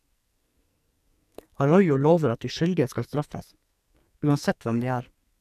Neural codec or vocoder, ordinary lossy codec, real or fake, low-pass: codec, 44.1 kHz, 2.6 kbps, SNAC; none; fake; 14.4 kHz